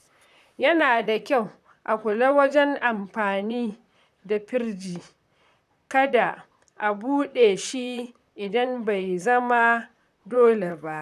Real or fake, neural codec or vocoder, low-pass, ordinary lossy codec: fake; vocoder, 44.1 kHz, 128 mel bands, Pupu-Vocoder; 14.4 kHz; none